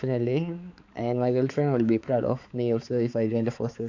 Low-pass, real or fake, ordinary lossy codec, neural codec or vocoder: 7.2 kHz; fake; AAC, 48 kbps; codec, 16 kHz, 4 kbps, X-Codec, HuBERT features, trained on balanced general audio